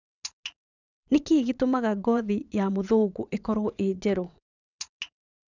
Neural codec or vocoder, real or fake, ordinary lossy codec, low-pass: vocoder, 22.05 kHz, 80 mel bands, WaveNeXt; fake; none; 7.2 kHz